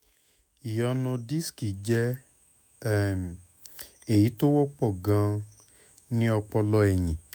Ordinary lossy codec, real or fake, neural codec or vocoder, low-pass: none; fake; autoencoder, 48 kHz, 128 numbers a frame, DAC-VAE, trained on Japanese speech; none